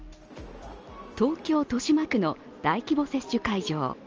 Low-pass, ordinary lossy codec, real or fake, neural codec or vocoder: 7.2 kHz; Opus, 24 kbps; real; none